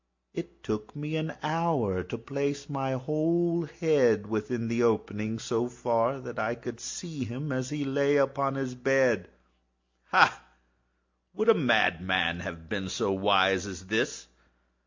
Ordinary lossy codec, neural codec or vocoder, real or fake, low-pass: MP3, 64 kbps; none; real; 7.2 kHz